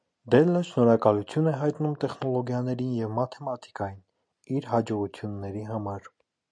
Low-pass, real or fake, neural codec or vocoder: 9.9 kHz; real; none